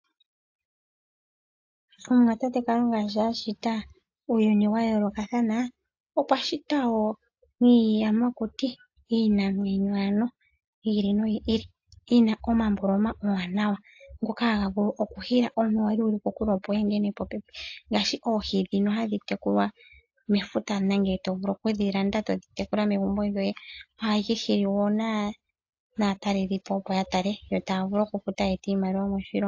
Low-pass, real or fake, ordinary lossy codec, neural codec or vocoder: 7.2 kHz; real; AAC, 48 kbps; none